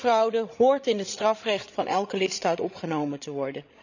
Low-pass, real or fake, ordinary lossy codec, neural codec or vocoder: 7.2 kHz; fake; none; codec, 16 kHz, 16 kbps, FreqCodec, larger model